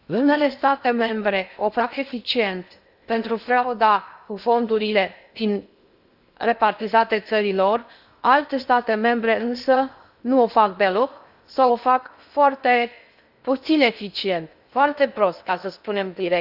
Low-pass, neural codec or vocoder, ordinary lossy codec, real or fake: 5.4 kHz; codec, 16 kHz in and 24 kHz out, 0.6 kbps, FocalCodec, streaming, 2048 codes; Opus, 64 kbps; fake